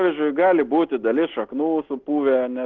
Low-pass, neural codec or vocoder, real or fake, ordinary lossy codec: 7.2 kHz; none; real; Opus, 16 kbps